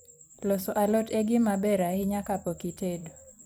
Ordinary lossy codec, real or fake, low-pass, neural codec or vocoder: none; fake; none; vocoder, 44.1 kHz, 128 mel bands every 256 samples, BigVGAN v2